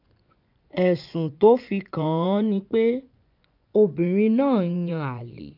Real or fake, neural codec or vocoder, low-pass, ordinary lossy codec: fake; vocoder, 24 kHz, 100 mel bands, Vocos; 5.4 kHz; none